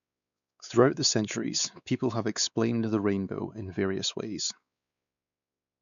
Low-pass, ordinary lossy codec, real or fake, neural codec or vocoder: 7.2 kHz; none; fake; codec, 16 kHz, 4 kbps, X-Codec, WavLM features, trained on Multilingual LibriSpeech